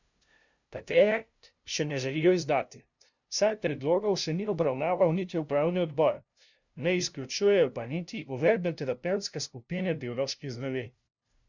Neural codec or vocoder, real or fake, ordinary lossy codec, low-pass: codec, 16 kHz, 0.5 kbps, FunCodec, trained on LibriTTS, 25 frames a second; fake; none; 7.2 kHz